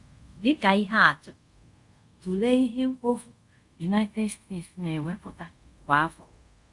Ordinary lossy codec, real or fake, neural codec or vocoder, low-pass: Opus, 64 kbps; fake; codec, 24 kHz, 0.5 kbps, DualCodec; 10.8 kHz